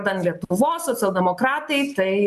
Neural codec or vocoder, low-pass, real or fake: none; 14.4 kHz; real